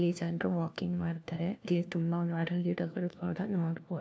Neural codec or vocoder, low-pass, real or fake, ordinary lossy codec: codec, 16 kHz, 1 kbps, FunCodec, trained on LibriTTS, 50 frames a second; none; fake; none